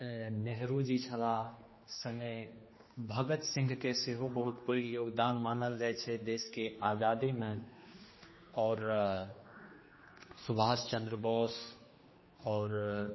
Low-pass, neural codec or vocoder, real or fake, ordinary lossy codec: 7.2 kHz; codec, 16 kHz, 2 kbps, X-Codec, HuBERT features, trained on general audio; fake; MP3, 24 kbps